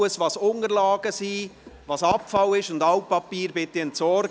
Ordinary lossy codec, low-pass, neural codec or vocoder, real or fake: none; none; none; real